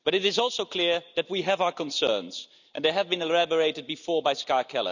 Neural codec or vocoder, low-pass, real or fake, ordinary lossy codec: none; 7.2 kHz; real; none